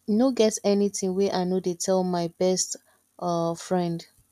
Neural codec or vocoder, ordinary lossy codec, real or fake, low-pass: none; none; real; 14.4 kHz